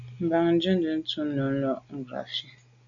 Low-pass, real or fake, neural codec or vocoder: 7.2 kHz; real; none